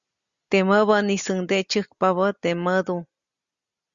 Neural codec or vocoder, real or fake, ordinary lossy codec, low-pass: none; real; Opus, 64 kbps; 7.2 kHz